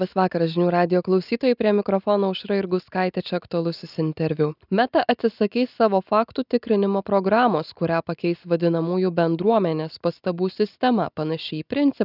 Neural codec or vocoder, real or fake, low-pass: none; real; 5.4 kHz